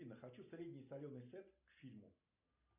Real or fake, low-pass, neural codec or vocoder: real; 3.6 kHz; none